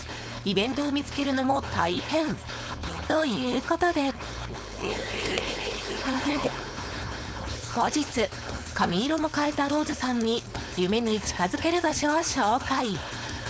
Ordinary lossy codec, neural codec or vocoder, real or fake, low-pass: none; codec, 16 kHz, 4.8 kbps, FACodec; fake; none